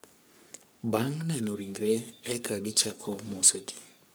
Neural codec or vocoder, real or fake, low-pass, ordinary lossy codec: codec, 44.1 kHz, 3.4 kbps, Pupu-Codec; fake; none; none